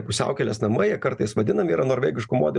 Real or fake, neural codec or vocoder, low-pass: real; none; 10.8 kHz